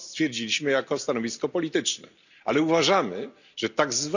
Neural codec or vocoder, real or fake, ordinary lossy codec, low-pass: none; real; none; 7.2 kHz